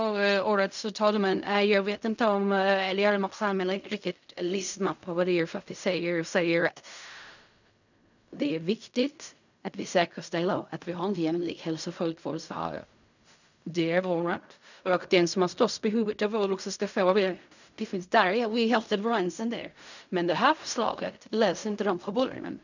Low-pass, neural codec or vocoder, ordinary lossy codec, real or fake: 7.2 kHz; codec, 16 kHz in and 24 kHz out, 0.4 kbps, LongCat-Audio-Codec, fine tuned four codebook decoder; none; fake